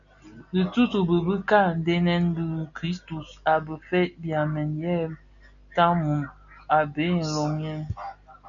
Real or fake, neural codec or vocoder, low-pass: real; none; 7.2 kHz